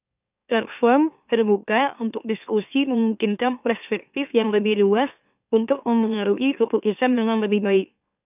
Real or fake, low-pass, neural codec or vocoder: fake; 3.6 kHz; autoencoder, 44.1 kHz, a latent of 192 numbers a frame, MeloTTS